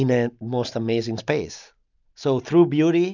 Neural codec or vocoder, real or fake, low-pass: none; real; 7.2 kHz